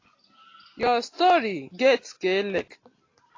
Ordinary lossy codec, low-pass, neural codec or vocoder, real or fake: AAC, 32 kbps; 7.2 kHz; none; real